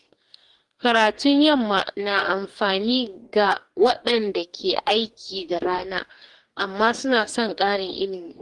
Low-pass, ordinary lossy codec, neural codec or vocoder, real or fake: 10.8 kHz; Opus, 24 kbps; codec, 44.1 kHz, 2.6 kbps, DAC; fake